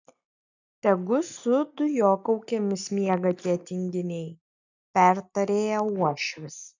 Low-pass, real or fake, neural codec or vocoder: 7.2 kHz; real; none